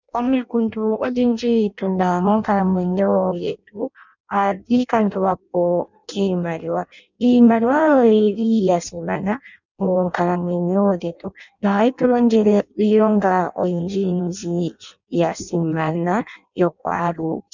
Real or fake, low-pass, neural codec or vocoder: fake; 7.2 kHz; codec, 16 kHz in and 24 kHz out, 0.6 kbps, FireRedTTS-2 codec